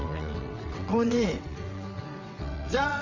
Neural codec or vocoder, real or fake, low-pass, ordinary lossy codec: vocoder, 22.05 kHz, 80 mel bands, WaveNeXt; fake; 7.2 kHz; none